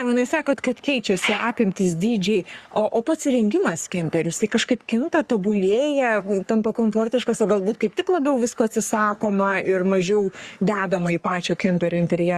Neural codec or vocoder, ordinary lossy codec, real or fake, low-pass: codec, 44.1 kHz, 3.4 kbps, Pupu-Codec; Opus, 64 kbps; fake; 14.4 kHz